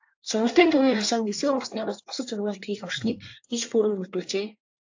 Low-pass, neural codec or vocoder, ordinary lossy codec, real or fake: 7.2 kHz; codec, 24 kHz, 1 kbps, SNAC; AAC, 48 kbps; fake